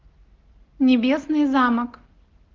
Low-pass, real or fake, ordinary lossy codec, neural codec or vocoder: 7.2 kHz; real; Opus, 16 kbps; none